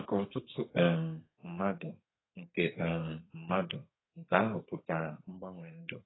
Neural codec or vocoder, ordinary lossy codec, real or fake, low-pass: codec, 32 kHz, 1.9 kbps, SNAC; AAC, 16 kbps; fake; 7.2 kHz